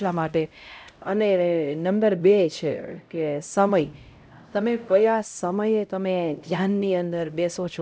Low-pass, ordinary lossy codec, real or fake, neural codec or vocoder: none; none; fake; codec, 16 kHz, 0.5 kbps, X-Codec, HuBERT features, trained on LibriSpeech